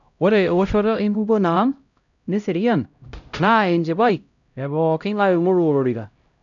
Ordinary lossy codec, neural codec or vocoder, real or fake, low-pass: none; codec, 16 kHz, 0.5 kbps, X-Codec, HuBERT features, trained on LibriSpeech; fake; 7.2 kHz